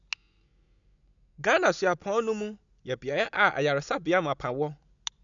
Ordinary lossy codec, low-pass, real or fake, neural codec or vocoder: none; 7.2 kHz; real; none